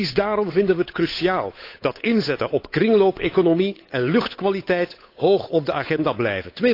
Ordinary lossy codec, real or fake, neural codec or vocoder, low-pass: AAC, 32 kbps; fake; codec, 16 kHz, 8 kbps, FunCodec, trained on Chinese and English, 25 frames a second; 5.4 kHz